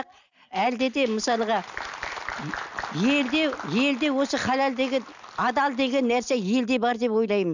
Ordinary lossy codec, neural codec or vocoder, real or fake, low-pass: none; none; real; 7.2 kHz